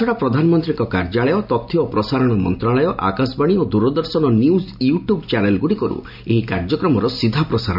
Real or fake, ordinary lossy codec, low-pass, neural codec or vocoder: real; none; 5.4 kHz; none